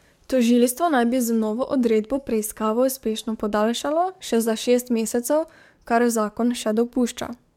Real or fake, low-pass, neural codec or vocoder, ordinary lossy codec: fake; 19.8 kHz; codec, 44.1 kHz, 7.8 kbps, DAC; MP3, 96 kbps